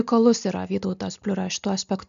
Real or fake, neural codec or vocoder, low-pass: real; none; 7.2 kHz